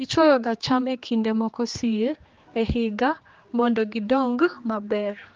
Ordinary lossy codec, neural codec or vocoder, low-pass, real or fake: Opus, 32 kbps; codec, 16 kHz, 2 kbps, X-Codec, HuBERT features, trained on general audio; 7.2 kHz; fake